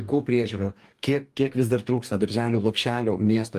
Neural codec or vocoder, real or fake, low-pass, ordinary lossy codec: codec, 44.1 kHz, 2.6 kbps, DAC; fake; 14.4 kHz; Opus, 32 kbps